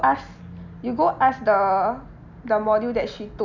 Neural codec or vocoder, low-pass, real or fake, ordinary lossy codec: none; 7.2 kHz; real; none